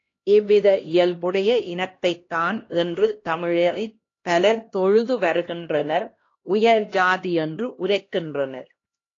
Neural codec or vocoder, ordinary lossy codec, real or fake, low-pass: codec, 16 kHz, 1 kbps, X-Codec, HuBERT features, trained on LibriSpeech; AAC, 32 kbps; fake; 7.2 kHz